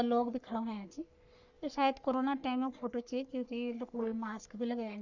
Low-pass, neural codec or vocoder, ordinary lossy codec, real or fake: 7.2 kHz; codec, 44.1 kHz, 3.4 kbps, Pupu-Codec; none; fake